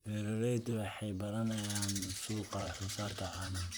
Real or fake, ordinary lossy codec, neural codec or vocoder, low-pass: fake; none; vocoder, 44.1 kHz, 128 mel bands, Pupu-Vocoder; none